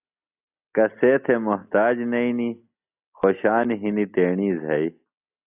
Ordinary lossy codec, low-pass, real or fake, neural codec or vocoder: AAC, 32 kbps; 3.6 kHz; real; none